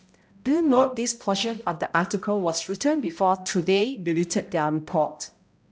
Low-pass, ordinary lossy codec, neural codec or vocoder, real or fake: none; none; codec, 16 kHz, 0.5 kbps, X-Codec, HuBERT features, trained on balanced general audio; fake